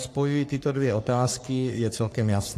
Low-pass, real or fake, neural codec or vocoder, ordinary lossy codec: 14.4 kHz; fake; codec, 44.1 kHz, 3.4 kbps, Pupu-Codec; AAC, 64 kbps